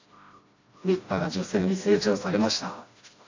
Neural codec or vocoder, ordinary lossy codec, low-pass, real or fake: codec, 16 kHz, 1 kbps, FreqCodec, smaller model; AAC, 48 kbps; 7.2 kHz; fake